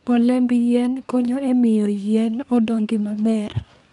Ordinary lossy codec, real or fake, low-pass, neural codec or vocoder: none; fake; 10.8 kHz; codec, 24 kHz, 1 kbps, SNAC